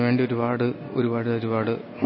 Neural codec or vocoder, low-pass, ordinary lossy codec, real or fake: none; 7.2 kHz; MP3, 24 kbps; real